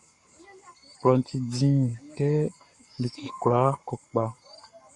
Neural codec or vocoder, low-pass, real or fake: codec, 44.1 kHz, 7.8 kbps, Pupu-Codec; 10.8 kHz; fake